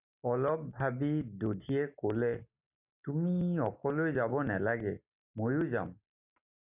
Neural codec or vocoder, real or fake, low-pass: none; real; 3.6 kHz